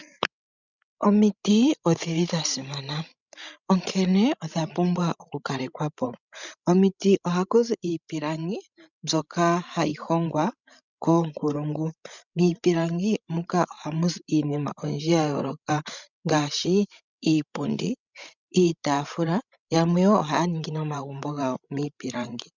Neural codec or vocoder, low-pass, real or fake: codec, 16 kHz, 16 kbps, FreqCodec, larger model; 7.2 kHz; fake